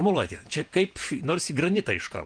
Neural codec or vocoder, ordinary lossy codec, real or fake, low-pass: vocoder, 48 kHz, 128 mel bands, Vocos; Opus, 24 kbps; fake; 9.9 kHz